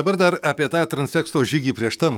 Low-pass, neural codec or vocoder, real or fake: 19.8 kHz; codec, 44.1 kHz, 7.8 kbps, DAC; fake